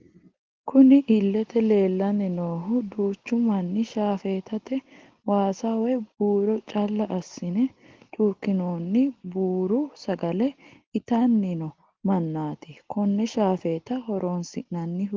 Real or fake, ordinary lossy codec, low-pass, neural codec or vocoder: real; Opus, 16 kbps; 7.2 kHz; none